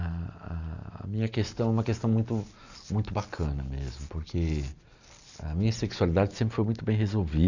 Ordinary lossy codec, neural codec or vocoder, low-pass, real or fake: none; none; 7.2 kHz; real